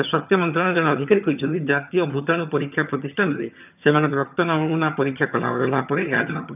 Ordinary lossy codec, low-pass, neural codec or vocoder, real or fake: none; 3.6 kHz; vocoder, 22.05 kHz, 80 mel bands, HiFi-GAN; fake